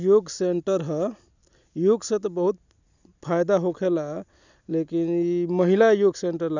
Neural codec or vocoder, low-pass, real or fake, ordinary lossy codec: none; 7.2 kHz; real; none